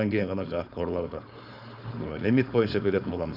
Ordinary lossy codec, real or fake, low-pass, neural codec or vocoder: none; fake; 5.4 kHz; codec, 16 kHz, 4.8 kbps, FACodec